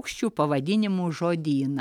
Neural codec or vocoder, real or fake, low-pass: none; real; 19.8 kHz